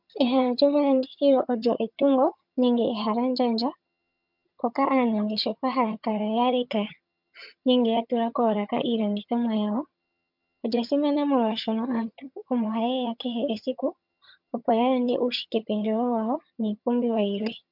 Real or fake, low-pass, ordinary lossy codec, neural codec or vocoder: fake; 5.4 kHz; MP3, 48 kbps; vocoder, 22.05 kHz, 80 mel bands, HiFi-GAN